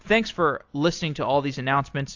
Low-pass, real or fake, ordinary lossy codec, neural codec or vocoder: 7.2 kHz; real; AAC, 48 kbps; none